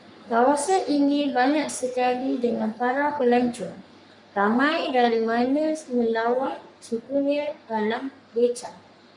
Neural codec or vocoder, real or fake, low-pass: codec, 44.1 kHz, 3.4 kbps, Pupu-Codec; fake; 10.8 kHz